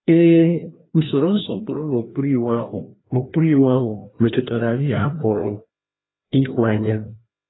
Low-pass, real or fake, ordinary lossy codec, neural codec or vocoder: 7.2 kHz; fake; AAC, 16 kbps; codec, 16 kHz, 1 kbps, FreqCodec, larger model